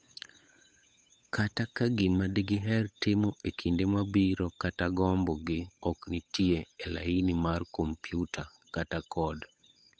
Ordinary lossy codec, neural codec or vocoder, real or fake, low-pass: none; codec, 16 kHz, 8 kbps, FunCodec, trained on Chinese and English, 25 frames a second; fake; none